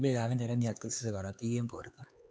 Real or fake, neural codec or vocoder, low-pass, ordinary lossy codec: fake; codec, 16 kHz, 4 kbps, X-Codec, HuBERT features, trained on LibriSpeech; none; none